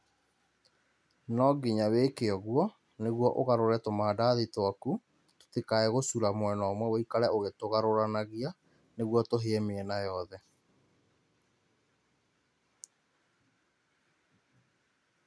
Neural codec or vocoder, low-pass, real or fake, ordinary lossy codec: none; none; real; none